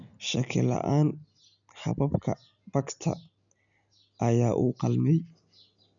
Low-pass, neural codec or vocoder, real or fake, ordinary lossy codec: 7.2 kHz; none; real; none